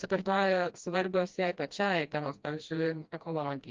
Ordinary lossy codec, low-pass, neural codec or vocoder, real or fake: Opus, 24 kbps; 7.2 kHz; codec, 16 kHz, 1 kbps, FreqCodec, smaller model; fake